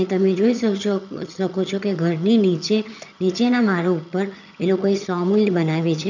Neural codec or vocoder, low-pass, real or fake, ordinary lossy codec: vocoder, 22.05 kHz, 80 mel bands, HiFi-GAN; 7.2 kHz; fake; none